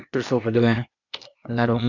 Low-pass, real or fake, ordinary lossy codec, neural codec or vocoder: 7.2 kHz; fake; none; codec, 16 kHz, 0.8 kbps, ZipCodec